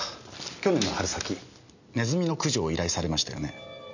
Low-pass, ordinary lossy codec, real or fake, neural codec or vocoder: 7.2 kHz; none; real; none